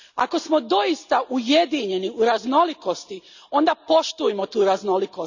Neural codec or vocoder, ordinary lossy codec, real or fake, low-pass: none; none; real; 7.2 kHz